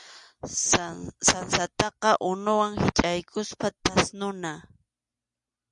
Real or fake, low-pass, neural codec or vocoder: real; 10.8 kHz; none